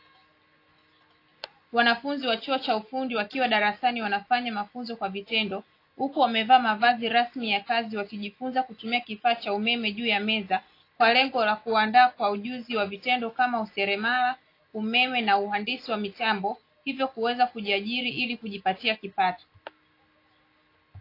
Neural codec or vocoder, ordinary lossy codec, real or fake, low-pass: none; AAC, 32 kbps; real; 5.4 kHz